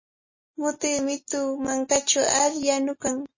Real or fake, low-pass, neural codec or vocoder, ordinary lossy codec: real; 7.2 kHz; none; MP3, 32 kbps